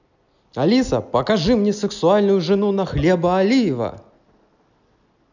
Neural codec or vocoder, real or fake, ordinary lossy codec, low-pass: none; real; none; 7.2 kHz